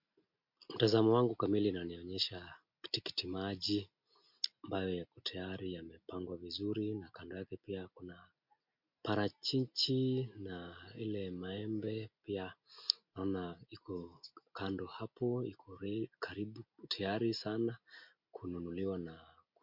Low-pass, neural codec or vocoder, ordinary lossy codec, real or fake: 5.4 kHz; none; MP3, 48 kbps; real